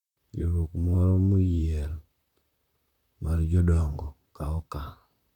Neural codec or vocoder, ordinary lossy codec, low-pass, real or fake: vocoder, 44.1 kHz, 128 mel bands, Pupu-Vocoder; none; 19.8 kHz; fake